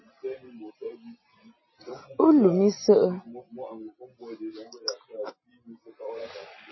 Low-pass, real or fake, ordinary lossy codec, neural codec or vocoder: 7.2 kHz; real; MP3, 24 kbps; none